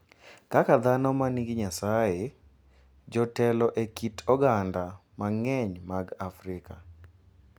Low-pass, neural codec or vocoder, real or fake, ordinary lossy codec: none; none; real; none